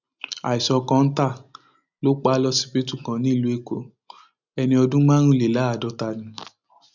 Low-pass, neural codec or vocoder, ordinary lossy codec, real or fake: 7.2 kHz; none; none; real